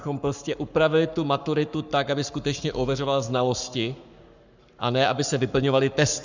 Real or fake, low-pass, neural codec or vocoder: fake; 7.2 kHz; codec, 44.1 kHz, 7.8 kbps, Pupu-Codec